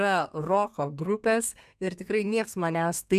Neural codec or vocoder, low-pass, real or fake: codec, 32 kHz, 1.9 kbps, SNAC; 14.4 kHz; fake